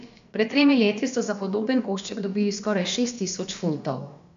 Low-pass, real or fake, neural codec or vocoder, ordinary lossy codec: 7.2 kHz; fake; codec, 16 kHz, about 1 kbps, DyCAST, with the encoder's durations; AAC, 64 kbps